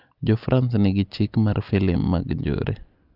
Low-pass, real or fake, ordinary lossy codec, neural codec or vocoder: 5.4 kHz; real; Opus, 24 kbps; none